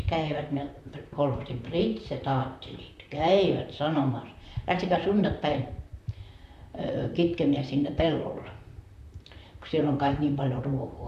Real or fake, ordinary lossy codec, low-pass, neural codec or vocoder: fake; AAC, 96 kbps; 14.4 kHz; vocoder, 44.1 kHz, 128 mel bands, Pupu-Vocoder